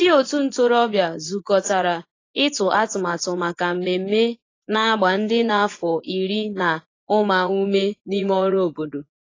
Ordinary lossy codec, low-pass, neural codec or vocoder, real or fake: AAC, 32 kbps; 7.2 kHz; vocoder, 24 kHz, 100 mel bands, Vocos; fake